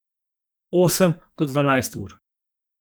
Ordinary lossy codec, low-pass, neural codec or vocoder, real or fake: none; none; codec, 44.1 kHz, 2.6 kbps, SNAC; fake